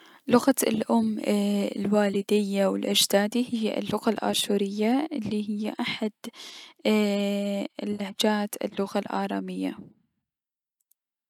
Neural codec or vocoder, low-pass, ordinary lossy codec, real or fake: none; 19.8 kHz; none; real